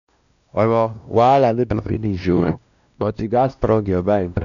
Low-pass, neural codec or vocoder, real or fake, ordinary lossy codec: 7.2 kHz; codec, 16 kHz, 1 kbps, X-Codec, WavLM features, trained on Multilingual LibriSpeech; fake; none